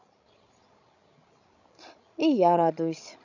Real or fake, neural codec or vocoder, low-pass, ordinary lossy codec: fake; codec, 16 kHz, 16 kbps, FreqCodec, larger model; 7.2 kHz; none